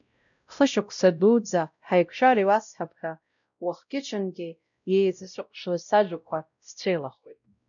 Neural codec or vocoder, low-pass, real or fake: codec, 16 kHz, 0.5 kbps, X-Codec, WavLM features, trained on Multilingual LibriSpeech; 7.2 kHz; fake